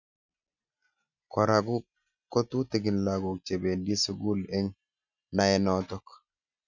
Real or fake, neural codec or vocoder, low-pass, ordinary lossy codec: real; none; 7.2 kHz; none